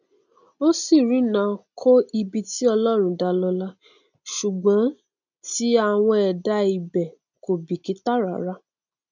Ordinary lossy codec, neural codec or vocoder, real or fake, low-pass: none; none; real; 7.2 kHz